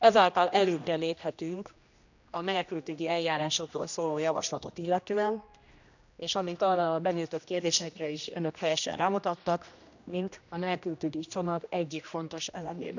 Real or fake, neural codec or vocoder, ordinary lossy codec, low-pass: fake; codec, 16 kHz, 1 kbps, X-Codec, HuBERT features, trained on general audio; none; 7.2 kHz